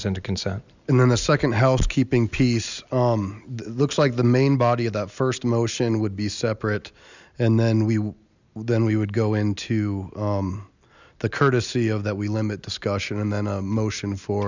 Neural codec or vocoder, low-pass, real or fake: none; 7.2 kHz; real